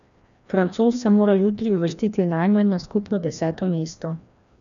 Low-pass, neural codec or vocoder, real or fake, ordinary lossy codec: 7.2 kHz; codec, 16 kHz, 1 kbps, FreqCodec, larger model; fake; none